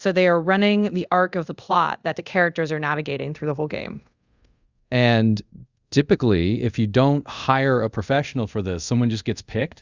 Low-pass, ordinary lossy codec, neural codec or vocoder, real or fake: 7.2 kHz; Opus, 64 kbps; codec, 24 kHz, 0.5 kbps, DualCodec; fake